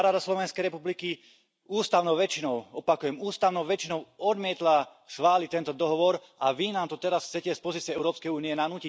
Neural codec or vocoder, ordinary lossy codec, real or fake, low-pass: none; none; real; none